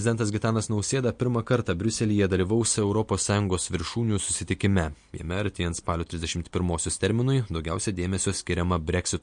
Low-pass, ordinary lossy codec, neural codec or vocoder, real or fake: 9.9 kHz; MP3, 48 kbps; none; real